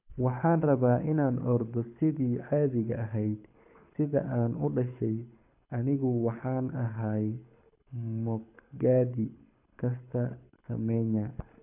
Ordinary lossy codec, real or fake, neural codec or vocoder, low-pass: none; fake; codec, 24 kHz, 6 kbps, HILCodec; 3.6 kHz